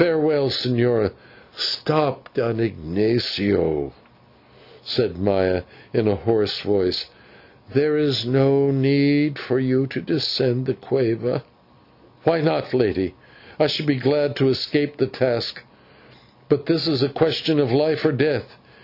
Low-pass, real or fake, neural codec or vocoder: 5.4 kHz; real; none